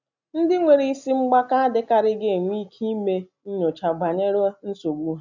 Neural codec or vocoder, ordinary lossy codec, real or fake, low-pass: none; none; real; 7.2 kHz